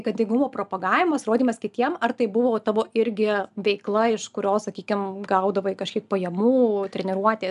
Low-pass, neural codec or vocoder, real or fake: 10.8 kHz; none; real